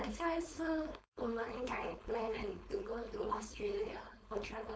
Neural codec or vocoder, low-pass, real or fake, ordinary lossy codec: codec, 16 kHz, 4.8 kbps, FACodec; none; fake; none